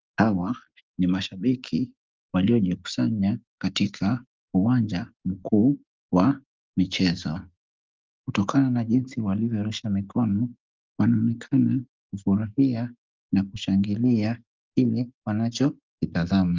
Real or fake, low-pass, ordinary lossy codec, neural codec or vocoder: real; 7.2 kHz; Opus, 32 kbps; none